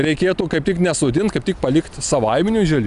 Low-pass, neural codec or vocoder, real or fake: 10.8 kHz; none; real